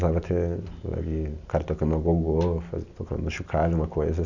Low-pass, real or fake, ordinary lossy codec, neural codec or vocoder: 7.2 kHz; real; none; none